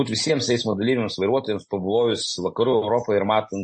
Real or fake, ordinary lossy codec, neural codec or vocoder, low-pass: real; MP3, 32 kbps; none; 9.9 kHz